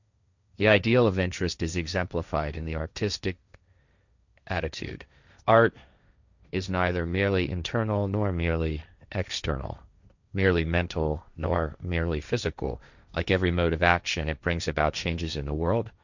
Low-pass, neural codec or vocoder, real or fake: 7.2 kHz; codec, 16 kHz, 1.1 kbps, Voila-Tokenizer; fake